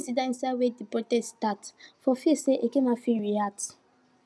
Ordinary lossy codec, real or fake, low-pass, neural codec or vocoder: none; real; none; none